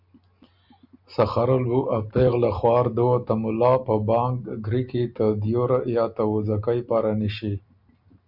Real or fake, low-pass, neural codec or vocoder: real; 5.4 kHz; none